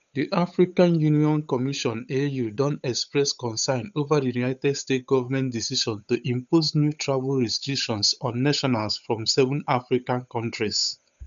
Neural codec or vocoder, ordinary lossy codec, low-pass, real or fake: codec, 16 kHz, 8 kbps, FunCodec, trained on Chinese and English, 25 frames a second; none; 7.2 kHz; fake